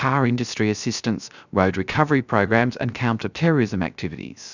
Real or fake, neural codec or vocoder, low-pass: fake; codec, 16 kHz, 0.3 kbps, FocalCodec; 7.2 kHz